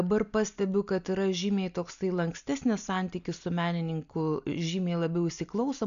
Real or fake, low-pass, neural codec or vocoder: real; 7.2 kHz; none